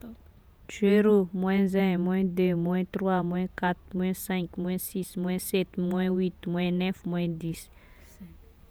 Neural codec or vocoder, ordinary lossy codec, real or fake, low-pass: vocoder, 48 kHz, 128 mel bands, Vocos; none; fake; none